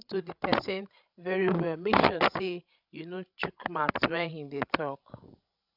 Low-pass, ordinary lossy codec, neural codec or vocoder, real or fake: 5.4 kHz; none; codec, 16 kHz, 16 kbps, FreqCodec, larger model; fake